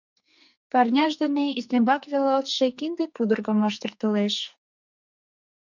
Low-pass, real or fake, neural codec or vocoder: 7.2 kHz; fake; codec, 44.1 kHz, 2.6 kbps, SNAC